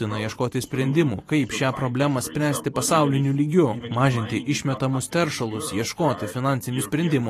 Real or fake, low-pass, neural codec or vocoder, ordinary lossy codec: fake; 14.4 kHz; vocoder, 44.1 kHz, 128 mel bands every 512 samples, BigVGAN v2; AAC, 48 kbps